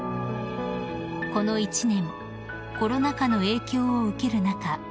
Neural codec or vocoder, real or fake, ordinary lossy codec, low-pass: none; real; none; none